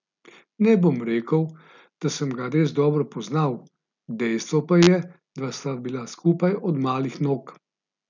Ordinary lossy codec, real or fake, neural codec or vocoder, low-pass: none; real; none; 7.2 kHz